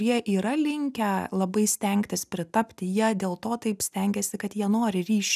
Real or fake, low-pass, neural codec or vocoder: real; 14.4 kHz; none